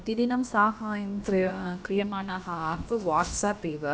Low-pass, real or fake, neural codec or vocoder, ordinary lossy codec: none; fake; codec, 16 kHz, about 1 kbps, DyCAST, with the encoder's durations; none